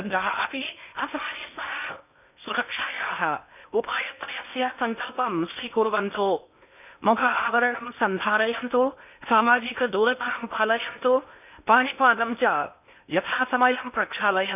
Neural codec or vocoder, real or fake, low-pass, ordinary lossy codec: codec, 16 kHz in and 24 kHz out, 0.8 kbps, FocalCodec, streaming, 65536 codes; fake; 3.6 kHz; none